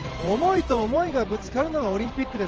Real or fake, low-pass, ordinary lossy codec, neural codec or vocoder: fake; 7.2 kHz; Opus, 16 kbps; vocoder, 44.1 kHz, 128 mel bands every 512 samples, BigVGAN v2